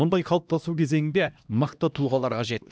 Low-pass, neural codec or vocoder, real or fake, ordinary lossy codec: none; codec, 16 kHz, 1 kbps, X-Codec, HuBERT features, trained on LibriSpeech; fake; none